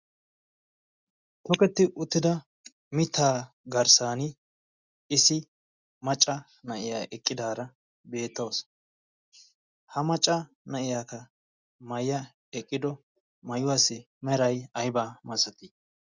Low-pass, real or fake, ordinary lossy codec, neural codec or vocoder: 7.2 kHz; real; Opus, 64 kbps; none